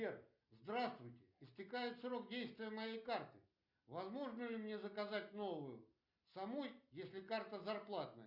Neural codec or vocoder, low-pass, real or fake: none; 5.4 kHz; real